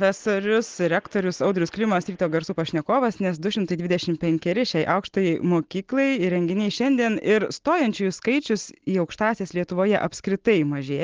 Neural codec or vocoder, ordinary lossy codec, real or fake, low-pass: none; Opus, 16 kbps; real; 7.2 kHz